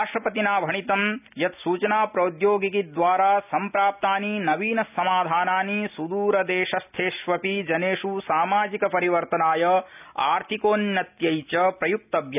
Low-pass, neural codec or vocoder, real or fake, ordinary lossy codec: 3.6 kHz; none; real; none